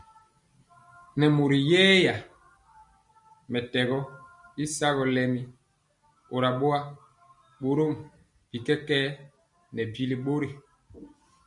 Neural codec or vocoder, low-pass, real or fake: none; 10.8 kHz; real